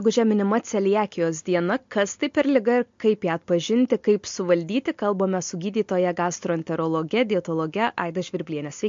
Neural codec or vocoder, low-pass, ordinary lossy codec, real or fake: none; 7.2 kHz; MP3, 48 kbps; real